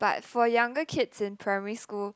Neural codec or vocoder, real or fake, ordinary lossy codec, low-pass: none; real; none; none